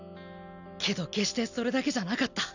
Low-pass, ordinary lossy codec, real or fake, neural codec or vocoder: 7.2 kHz; none; real; none